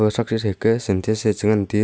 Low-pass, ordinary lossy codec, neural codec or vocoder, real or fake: none; none; none; real